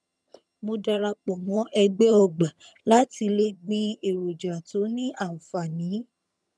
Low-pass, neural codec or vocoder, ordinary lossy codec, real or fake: none; vocoder, 22.05 kHz, 80 mel bands, HiFi-GAN; none; fake